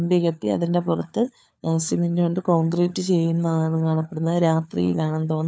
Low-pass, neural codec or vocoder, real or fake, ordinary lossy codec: none; codec, 16 kHz, 4 kbps, FunCodec, trained on LibriTTS, 50 frames a second; fake; none